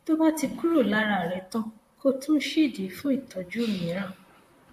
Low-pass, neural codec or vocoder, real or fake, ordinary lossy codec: 14.4 kHz; vocoder, 44.1 kHz, 128 mel bands, Pupu-Vocoder; fake; MP3, 64 kbps